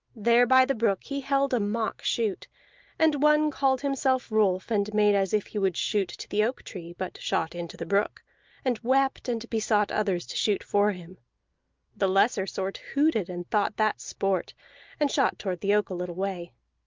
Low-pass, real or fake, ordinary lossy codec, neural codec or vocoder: 7.2 kHz; real; Opus, 32 kbps; none